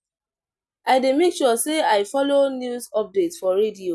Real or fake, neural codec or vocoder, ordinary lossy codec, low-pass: real; none; none; none